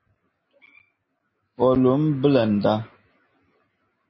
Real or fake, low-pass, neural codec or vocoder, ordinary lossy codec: real; 7.2 kHz; none; MP3, 24 kbps